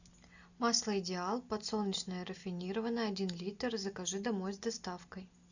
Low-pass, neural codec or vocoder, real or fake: 7.2 kHz; none; real